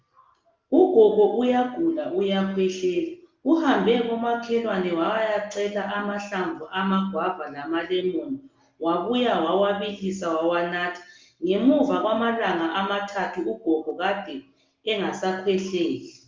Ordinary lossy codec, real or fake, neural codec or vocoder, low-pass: Opus, 32 kbps; real; none; 7.2 kHz